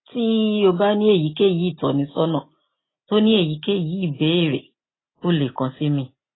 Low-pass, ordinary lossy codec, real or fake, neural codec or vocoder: 7.2 kHz; AAC, 16 kbps; real; none